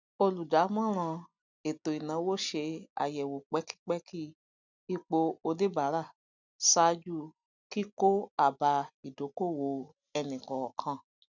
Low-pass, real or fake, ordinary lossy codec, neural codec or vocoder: 7.2 kHz; real; none; none